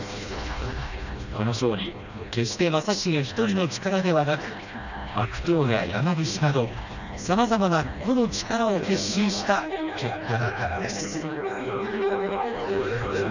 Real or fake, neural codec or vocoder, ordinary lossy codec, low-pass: fake; codec, 16 kHz, 1 kbps, FreqCodec, smaller model; none; 7.2 kHz